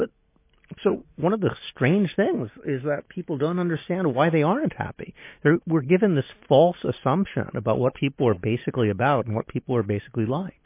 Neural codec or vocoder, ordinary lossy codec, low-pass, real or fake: none; MP3, 24 kbps; 3.6 kHz; real